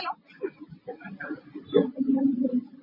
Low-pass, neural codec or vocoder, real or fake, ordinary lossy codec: 5.4 kHz; none; real; MP3, 24 kbps